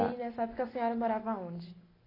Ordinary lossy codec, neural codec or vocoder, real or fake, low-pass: AAC, 24 kbps; none; real; 5.4 kHz